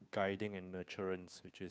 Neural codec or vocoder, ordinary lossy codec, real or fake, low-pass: codec, 16 kHz, 2 kbps, FunCodec, trained on Chinese and English, 25 frames a second; none; fake; none